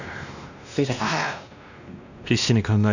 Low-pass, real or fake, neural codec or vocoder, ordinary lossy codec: 7.2 kHz; fake; codec, 16 kHz, 1 kbps, X-Codec, WavLM features, trained on Multilingual LibriSpeech; none